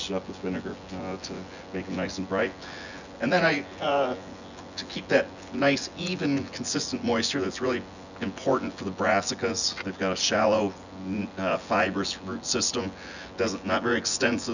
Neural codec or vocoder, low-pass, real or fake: vocoder, 24 kHz, 100 mel bands, Vocos; 7.2 kHz; fake